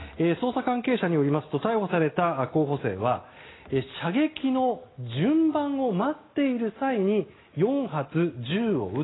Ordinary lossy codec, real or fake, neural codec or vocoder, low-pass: AAC, 16 kbps; real; none; 7.2 kHz